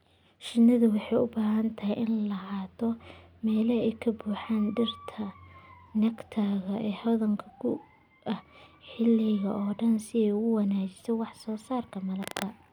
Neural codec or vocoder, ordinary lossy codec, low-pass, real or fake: none; none; 19.8 kHz; real